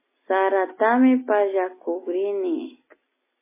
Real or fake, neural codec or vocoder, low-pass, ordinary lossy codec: real; none; 3.6 kHz; MP3, 16 kbps